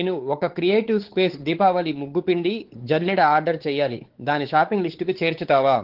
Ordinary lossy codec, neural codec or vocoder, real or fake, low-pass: Opus, 16 kbps; codec, 16 kHz, 4 kbps, X-Codec, WavLM features, trained on Multilingual LibriSpeech; fake; 5.4 kHz